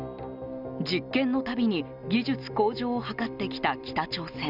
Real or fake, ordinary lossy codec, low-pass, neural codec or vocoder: real; none; 5.4 kHz; none